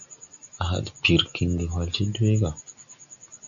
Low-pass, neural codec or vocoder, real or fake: 7.2 kHz; none; real